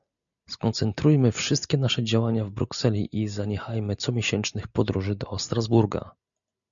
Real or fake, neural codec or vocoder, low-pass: real; none; 7.2 kHz